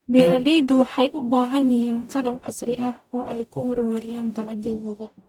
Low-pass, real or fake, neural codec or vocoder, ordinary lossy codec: 19.8 kHz; fake; codec, 44.1 kHz, 0.9 kbps, DAC; none